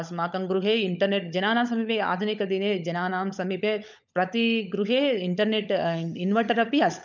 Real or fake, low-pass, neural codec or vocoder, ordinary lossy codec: fake; 7.2 kHz; codec, 16 kHz, 16 kbps, FunCodec, trained on LibriTTS, 50 frames a second; none